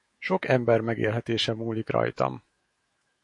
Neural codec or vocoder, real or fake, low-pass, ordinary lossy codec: autoencoder, 48 kHz, 128 numbers a frame, DAC-VAE, trained on Japanese speech; fake; 10.8 kHz; MP3, 48 kbps